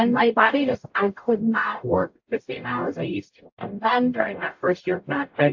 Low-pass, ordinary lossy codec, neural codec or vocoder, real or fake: 7.2 kHz; AAC, 48 kbps; codec, 44.1 kHz, 0.9 kbps, DAC; fake